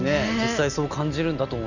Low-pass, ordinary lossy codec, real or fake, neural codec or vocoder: 7.2 kHz; none; real; none